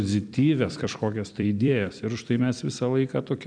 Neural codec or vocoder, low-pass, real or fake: vocoder, 44.1 kHz, 128 mel bands every 256 samples, BigVGAN v2; 9.9 kHz; fake